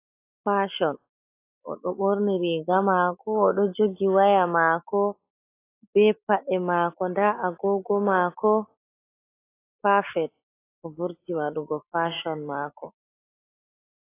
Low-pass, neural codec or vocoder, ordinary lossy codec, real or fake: 3.6 kHz; none; AAC, 24 kbps; real